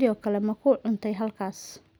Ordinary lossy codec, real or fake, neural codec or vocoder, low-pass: none; real; none; none